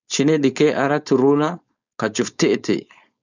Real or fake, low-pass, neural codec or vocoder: fake; 7.2 kHz; codec, 16 kHz, 4.8 kbps, FACodec